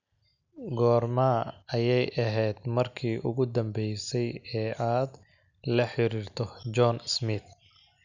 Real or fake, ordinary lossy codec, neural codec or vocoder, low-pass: real; none; none; 7.2 kHz